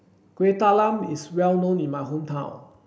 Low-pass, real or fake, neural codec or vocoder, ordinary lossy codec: none; real; none; none